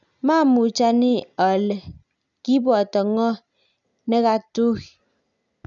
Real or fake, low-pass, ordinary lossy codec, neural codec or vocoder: real; 7.2 kHz; none; none